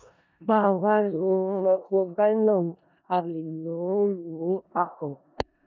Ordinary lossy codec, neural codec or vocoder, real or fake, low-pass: none; codec, 16 kHz in and 24 kHz out, 0.4 kbps, LongCat-Audio-Codec, four codebook decoder; fake; 7.2 kHz